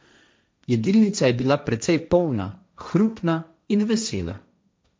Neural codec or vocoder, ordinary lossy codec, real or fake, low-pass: codec, 16 kHz, 1.1 kbps, Voila-Tokenizer; none; fake; none